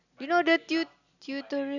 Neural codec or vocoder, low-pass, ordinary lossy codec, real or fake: none; 7.2 kHz; none; real